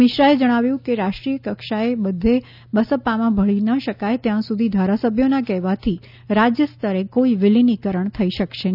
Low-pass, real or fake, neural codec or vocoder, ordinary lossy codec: 5.4 kHz; real; none; none